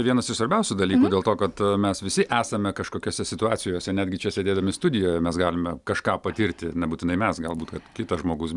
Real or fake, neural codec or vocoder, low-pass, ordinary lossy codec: real; none; 10.8 kHz; Opus, 64 kbps